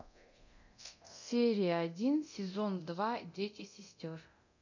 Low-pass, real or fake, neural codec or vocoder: 7.2 kHz; fake; codec, 24 kHz, 0.9 kbps, DualCodec